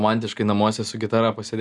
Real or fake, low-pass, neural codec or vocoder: real; 10.8 kHz; none